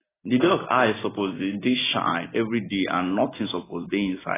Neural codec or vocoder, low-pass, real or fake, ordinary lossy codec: none; 3.6 kHz; real; AAC, 16 kbps